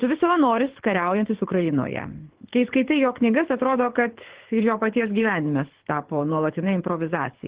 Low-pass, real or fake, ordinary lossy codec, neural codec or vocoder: 3.6 kHz; fake; Opus, 16 kbps; codec, 24 kHz, 6 kbps, HILCodec